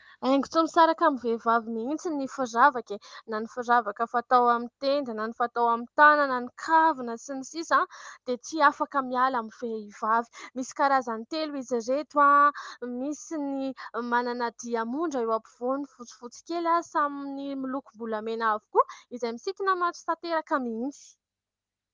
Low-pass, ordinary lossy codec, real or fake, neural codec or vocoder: 7.2 kHz; Opus, 32 kbps; real; none